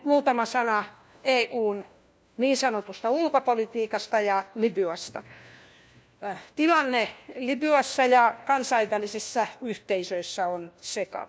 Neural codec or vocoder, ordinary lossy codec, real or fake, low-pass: codec, 16 kHz, 1 kbps, FunCodec, trained on LibriTTS, 50 frames a second; none; fake; none